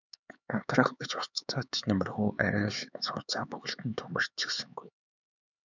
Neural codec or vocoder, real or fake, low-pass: codec, 16 kHz, 4 kbps, X-Codec, HuBERT features, trained on LibriSpeech; fake; 7.2 kHz